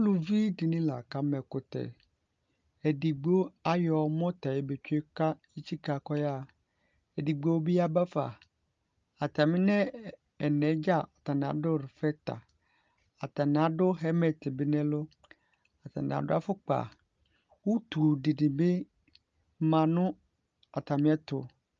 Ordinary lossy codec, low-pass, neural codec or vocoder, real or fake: Opus, 24 kbps; 7.2 kHz; none; real